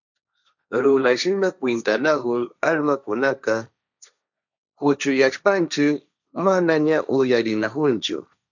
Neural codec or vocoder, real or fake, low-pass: codec, 16 kHz, 1.1 kbps, Voila-Tokenizer; fake; 7.2 kHz